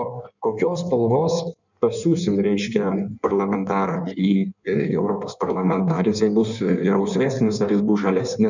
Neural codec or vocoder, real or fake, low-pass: codec, 16 kHz in and 24 kHz out, 1.1 kbps, FireRedTTS-2 codec; fake; 7.2 kHz